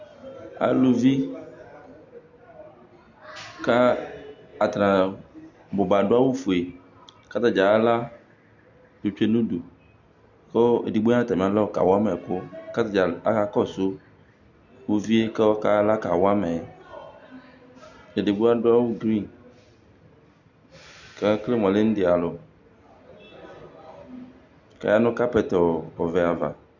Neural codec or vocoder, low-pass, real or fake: none; 7.2 kHz; real